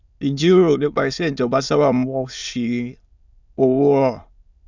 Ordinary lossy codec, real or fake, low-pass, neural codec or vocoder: none; fake; 7.2 kHz; autoencoder, 22.05 kHz, a latent of 192 numbers a frame, VITS, trained on many speakers